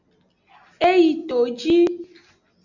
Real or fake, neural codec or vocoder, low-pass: real; none; 7.2 kHz